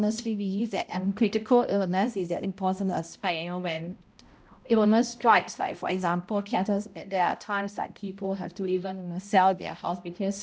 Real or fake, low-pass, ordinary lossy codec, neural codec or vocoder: fake; none; none; codec, 16 kHz, 0.5 kbps, X-Codec, HuBERT features, trained on balanced general audio